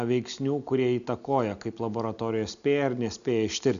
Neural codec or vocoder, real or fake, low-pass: none; real; 7.2 kHz